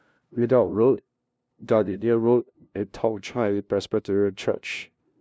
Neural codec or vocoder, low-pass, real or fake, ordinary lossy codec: codec, 16 kHz, 0.5 kbps, FunCodec, trained on LibriTTS, 25 frames a second; none; fake; none